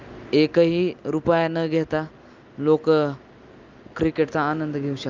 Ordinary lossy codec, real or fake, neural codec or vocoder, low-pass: Opus, 24 kbps; real; none; 7.2 kHz